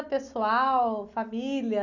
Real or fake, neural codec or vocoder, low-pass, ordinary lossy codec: real; none; 7.2 kHz; none